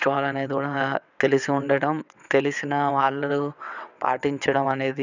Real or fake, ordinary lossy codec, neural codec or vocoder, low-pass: fake; none; vocoder, 22.05 kHz, 80 mel bands, WaveNeXt; 7.2 kHz